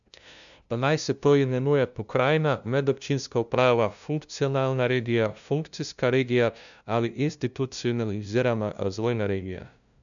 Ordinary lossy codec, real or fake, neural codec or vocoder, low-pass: none; fake; codec, 16 kHz, 0.5 kbps, FunCodec, trained on LibriTTS, 25 frames a second; 7.2 kHz